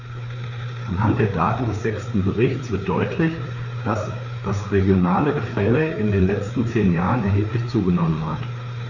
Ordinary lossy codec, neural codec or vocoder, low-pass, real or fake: none; codec, 16 kHz, 4 kbps, FreqCodec, larger model; 7.2 kHz; fake